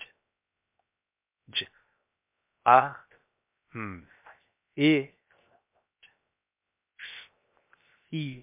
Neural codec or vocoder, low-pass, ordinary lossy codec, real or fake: codec, 16 kHz, 0.7 kbps, FocalCodec; 3.6 kHz; MP3, 32 kbps; fake